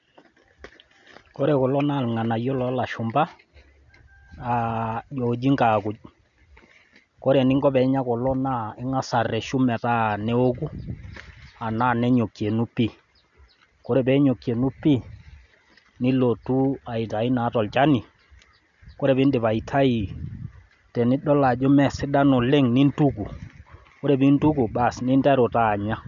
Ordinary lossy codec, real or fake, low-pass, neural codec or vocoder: none; real; 7.2 kHz; none